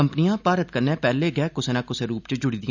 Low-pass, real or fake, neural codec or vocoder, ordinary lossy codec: 7.2 kHz; real; none; none